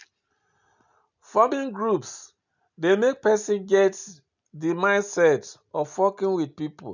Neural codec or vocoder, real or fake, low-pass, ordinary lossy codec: none; real; 7.2 kHz; none